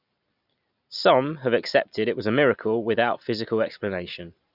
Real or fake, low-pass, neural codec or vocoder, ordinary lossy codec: real; 5.4 kHz; none; none